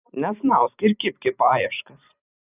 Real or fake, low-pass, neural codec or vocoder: fake; 3.6 kHz; vocoder, 44.1 kHz, 128 mel bands every 512 samples, BigVGAN v2